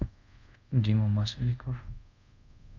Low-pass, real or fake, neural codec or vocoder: 7.2 kHz; fake; codec, 24 kHz, 0.5 kbps, DualCodec